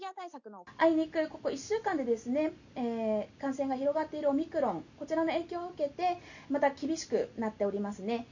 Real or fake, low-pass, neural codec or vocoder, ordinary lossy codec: real; 7.2 kHz; none; none